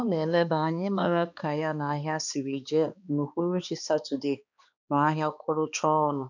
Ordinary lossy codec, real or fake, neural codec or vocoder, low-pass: none; fake; codec, 16 kHz, 2 kbps, X-Codec, HuBERT features, trained on balanced general audio; 7.2 kHz